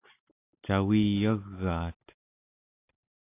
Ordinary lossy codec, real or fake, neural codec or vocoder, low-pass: AAC, 24 kbps; real; none; 3.6 kHz